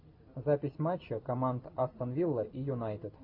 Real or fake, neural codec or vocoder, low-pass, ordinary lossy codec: fake; vocoder, 44.1 kHz, 128 mel bands every 256 samples, BigVGAN v2; 5.4 kHz; AAC, 48 kbps